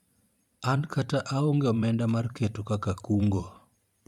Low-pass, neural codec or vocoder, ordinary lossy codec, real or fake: 19.8 kHz; vocoder, 44.1 kHz, 128 mel bands every 512 samples, BigVGAN v2; none; fake